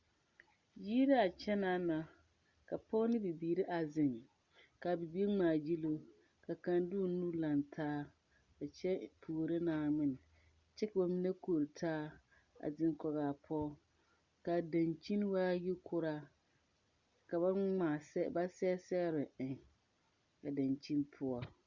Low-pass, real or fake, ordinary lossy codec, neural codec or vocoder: 7.2 kHz; real; Opus, 64 kbps; none